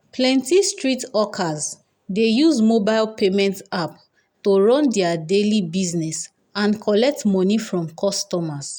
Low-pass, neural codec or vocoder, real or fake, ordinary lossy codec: 19.8 kHz; none; real; none